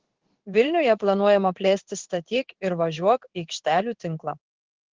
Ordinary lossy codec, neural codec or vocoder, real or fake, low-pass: Opus, 16 kbps; codec, 16 kHz in and 24 kHz out, 1 kbps, XY-Tokenizer; fake; 7.2 kHz